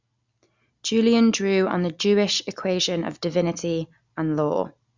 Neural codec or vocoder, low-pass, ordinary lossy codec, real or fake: none; 7.2 kHz; Opus, 64 kbps; real